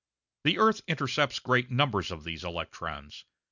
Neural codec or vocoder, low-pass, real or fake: none; 7.2 kHz; real